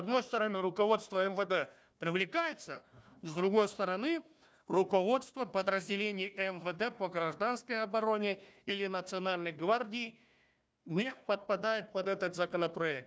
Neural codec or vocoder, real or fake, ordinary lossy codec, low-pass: codec, 16 kHz, 1 kbps, FunCodec, trained on Chinese and English, 50 frames a second; fake; none; none